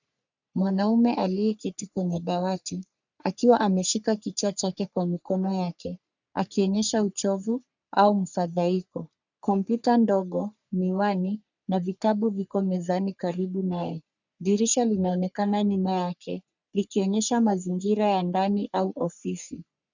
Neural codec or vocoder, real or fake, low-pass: codec, 44.1 kHz, 3.4 kbps, Pupu-Codec; fake; 7.2 kHz